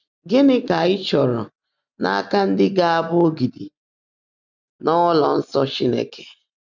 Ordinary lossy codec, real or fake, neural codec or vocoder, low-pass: none; real; none; 7.2 kHz